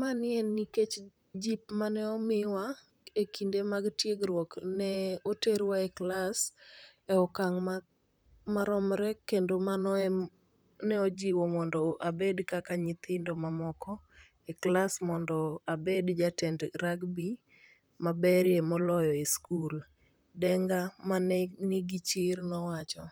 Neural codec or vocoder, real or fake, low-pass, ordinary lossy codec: vocoder, 44.1 kHz, 128 mel bands, Pupu-Vocoder; fake; none; none